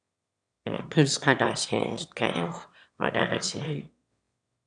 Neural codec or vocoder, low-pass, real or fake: autoencoder, 22.05 kHz, a latent of 192 numbers a frame, VITS, trained on one speaker; 9.9 kHz; fake